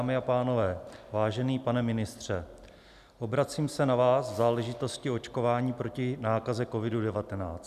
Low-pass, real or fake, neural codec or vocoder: 14.4 kHz; real; none